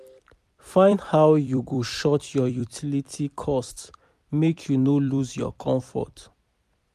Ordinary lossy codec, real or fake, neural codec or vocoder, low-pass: MP3, 96 kbps; fake; vocoder, 44.1 kHz, 128 mel bands every 256 samples, BigVGAN v2; 14.4 kHz